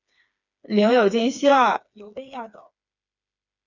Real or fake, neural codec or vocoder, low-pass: fake; codec, 16 kHz, 4 kbps, FreqCodec, smaller model; 7.2 kHz